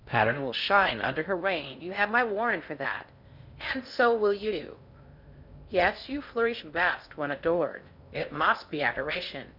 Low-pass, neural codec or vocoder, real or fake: 5.4 kHz; codec, 16 kHz in and 24 kHz out, 0.6 kbps, FocalCodec, streaming, 4096 codes; fake